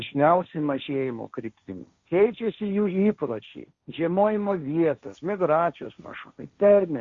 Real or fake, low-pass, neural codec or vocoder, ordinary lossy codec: fake; 7.2 kHz; codec, 16 kHz, 1.1 kbps, Voila-Tokenizer; Opus, 64 kbps